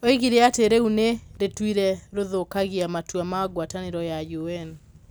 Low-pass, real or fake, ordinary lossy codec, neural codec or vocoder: none; real; none; none